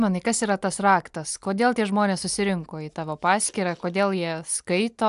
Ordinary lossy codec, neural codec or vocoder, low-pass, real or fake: AAC, 96 kbps; none; 10.8 kHz; real